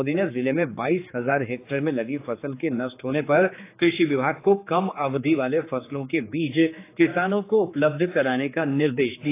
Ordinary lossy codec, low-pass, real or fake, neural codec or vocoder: AAC, 24 kbps; 3.6 kHz; fake; codec, 16 kHz, 4 kbps, X-Codec, HuBERT features, trained on general audio